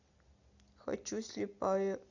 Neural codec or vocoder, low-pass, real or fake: none; 7.2 kHz; real